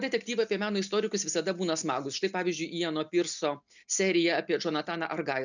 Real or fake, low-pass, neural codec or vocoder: real; 7.2 kHz; none